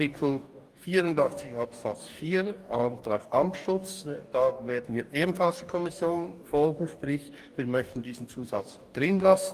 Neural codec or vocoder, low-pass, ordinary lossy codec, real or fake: codec, 44.1 kHz, 2.6 kbps, DAC; 14.4 kHz; Opus, 32 kbps; fake